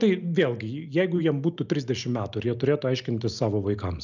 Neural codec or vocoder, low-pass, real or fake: none; 7.2 kHz; real